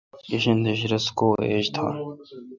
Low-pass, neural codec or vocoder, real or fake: 7.2 kHz; none; real